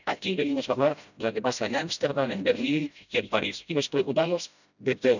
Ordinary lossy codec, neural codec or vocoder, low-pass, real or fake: none; codec, 16 kHz, 0.5 kbps, FreqCodec, smaller model; 7.2 kHz; fake